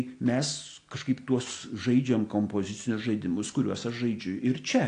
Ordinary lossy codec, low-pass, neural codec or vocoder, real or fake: AAC, 48 kbps; 9.9 kHz; none; real